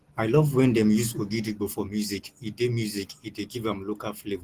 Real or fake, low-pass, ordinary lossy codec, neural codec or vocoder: real; 14.4 kHz; Opus, 16 kbps; none